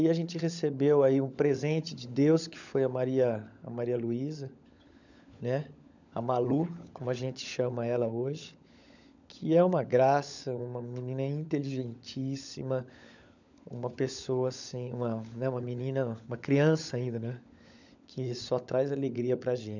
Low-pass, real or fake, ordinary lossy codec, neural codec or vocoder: 7.2 kHz; fake; none; codec, 16 kHz, 16 kbps, FunCodec, trained on LibriTTS, 50 frames a second